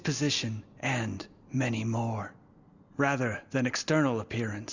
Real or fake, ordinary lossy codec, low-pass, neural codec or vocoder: real; Opus, 64 kbps; 7.2 kHz; none